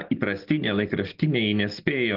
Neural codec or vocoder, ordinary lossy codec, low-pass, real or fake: none; Opus, 16 kbps; 5.4 kHz; real